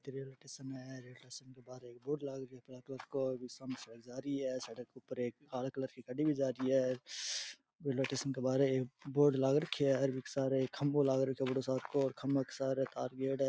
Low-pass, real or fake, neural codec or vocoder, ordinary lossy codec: none; real; none; none